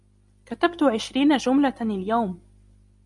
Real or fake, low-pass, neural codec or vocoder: real; 10.8 kHz; none